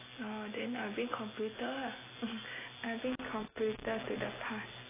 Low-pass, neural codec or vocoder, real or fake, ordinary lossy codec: 3.6 kHz; none; real; AAC, 16 kbps